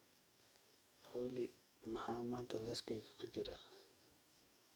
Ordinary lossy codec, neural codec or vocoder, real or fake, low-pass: none; codec, 44.1 kHz, 2.6 kbps, DAC; fake; none